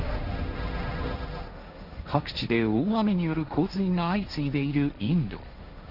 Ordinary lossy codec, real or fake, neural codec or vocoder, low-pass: none; fake; codec, 16 kHz, 1.1 kbps, Voila-Tokenizer; 5.4 kHz